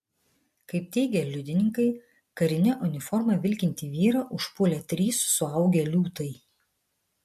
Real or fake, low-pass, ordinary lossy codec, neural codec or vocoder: real; 14.4 kHz; MP3, 64 kbps; none